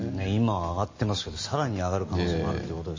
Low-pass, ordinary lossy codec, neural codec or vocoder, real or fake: 7.2 kHz; MP3, 32 kbps; none; real